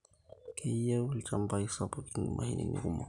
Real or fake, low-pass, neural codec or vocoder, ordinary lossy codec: real; 10.8 kHz; none; none